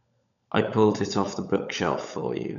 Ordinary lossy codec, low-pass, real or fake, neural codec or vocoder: none; 7.2 kHz; fake; codec, 16 kHz, 16 kbps, FunCodec, trained on LibriTTS, 50 frames a second